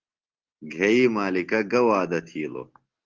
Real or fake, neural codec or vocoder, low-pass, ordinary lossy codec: real; none; 7.2 kHz; Opus, 16 kbps